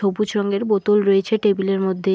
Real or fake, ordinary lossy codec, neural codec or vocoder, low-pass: real; none; none; none